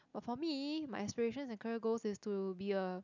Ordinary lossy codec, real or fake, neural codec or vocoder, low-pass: none; real; none; 7.2 kHz